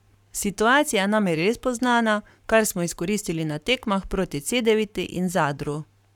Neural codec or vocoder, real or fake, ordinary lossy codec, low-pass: codec, 44.1 kHz, 7.8 kbps, Pupu-Codec; fake; none; 19.8 kHz